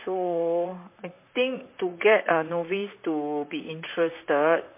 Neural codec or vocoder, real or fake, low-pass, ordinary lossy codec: vocoder, 44.1 kHz, 128 mel bands, Pupu-Vocoder; fake; 3.6 kHz; MP3, 24 kbps